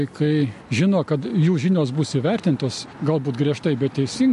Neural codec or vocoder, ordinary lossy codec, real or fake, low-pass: none; MP3, 48 kbps; real; 14.4 kHz